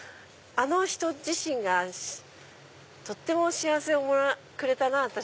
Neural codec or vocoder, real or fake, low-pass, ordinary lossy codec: none; real; none; none